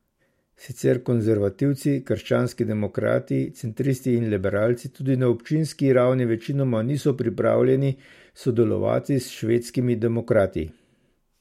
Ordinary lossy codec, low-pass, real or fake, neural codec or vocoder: MP3, 64 kbps; 19.8 kHz; real; none